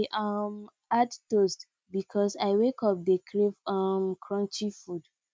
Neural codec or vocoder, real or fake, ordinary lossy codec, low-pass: none; real; none; none